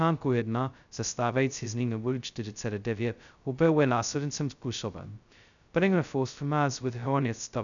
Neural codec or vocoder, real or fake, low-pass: codec, 16 kHz, 0.2 kbps, FocalCodec; fake; 7.2 kHz